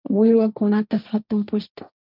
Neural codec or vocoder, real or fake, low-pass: codec, 16 kHz, 1.1 kbps, Voila-Tokenizer; fake; 5.4 kHz